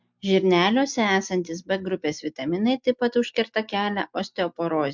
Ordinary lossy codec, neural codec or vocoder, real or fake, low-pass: MP3, 64 kbps; none; real; 7.2 kHz